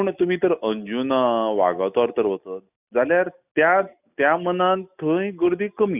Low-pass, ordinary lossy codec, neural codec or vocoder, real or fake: 3.6 kHz; none; none; real